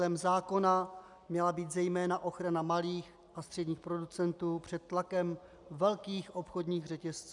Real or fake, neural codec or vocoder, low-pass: real; none; 10.8 kHz